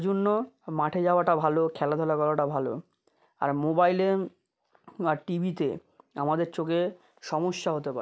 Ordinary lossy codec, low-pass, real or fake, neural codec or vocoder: none; none; real; none